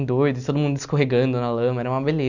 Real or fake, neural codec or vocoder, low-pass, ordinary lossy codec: real; none; 7.2 kHz; none